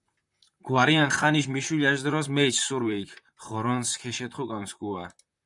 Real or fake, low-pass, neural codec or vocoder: fake; 10.8 kHz; vocoder, 44.1 kHz, 128 mel bands, Pupu-Vocoder